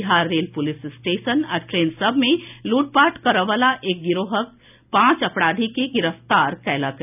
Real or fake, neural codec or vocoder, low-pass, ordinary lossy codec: real; none; 3.6 kHz; none